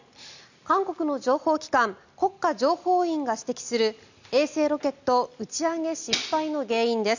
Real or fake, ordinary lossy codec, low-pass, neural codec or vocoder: real; none; 7.2 kHz; none